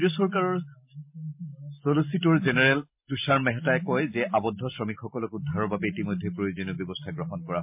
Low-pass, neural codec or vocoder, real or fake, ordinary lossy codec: 3.6 kHz; none; real; MP3, 32 kbps